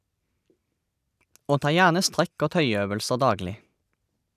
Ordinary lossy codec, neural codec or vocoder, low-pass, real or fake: none; none; 14.4 kHz; real